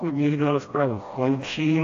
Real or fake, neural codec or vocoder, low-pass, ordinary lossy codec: fake; codec, 16 kHz, 1 kbps, FreqCodec, smaller model; 7.2 kHz; AAC, 48 kbps